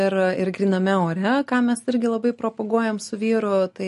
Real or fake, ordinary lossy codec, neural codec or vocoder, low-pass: real; MP3, 48 kbps; none; 14.4 kHz